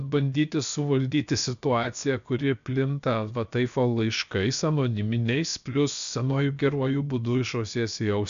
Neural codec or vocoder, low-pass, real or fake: codec, 16 kHz, 0.7 kbps, FocalCodec; 7.2 kHz; fake